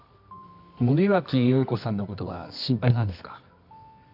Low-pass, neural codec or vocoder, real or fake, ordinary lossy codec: 5.4 kHz; codec, 24 kHz, 0.9 kbps, WavTokenizer, medium music audio release; fake; none